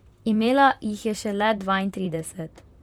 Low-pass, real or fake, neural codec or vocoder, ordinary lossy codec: 19.8 kHz; fake; vocoder, 44.1 kHz, 128 mel bands, Pupu-Vocoder; none